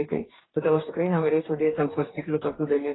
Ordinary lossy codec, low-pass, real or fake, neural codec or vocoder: AAC, 16 kbps; 7.2 kHz; fake; codec, 44.1 kHz, 2.6 kbps, DAC